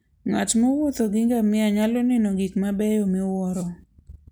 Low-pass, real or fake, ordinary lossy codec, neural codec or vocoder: none; real; none; none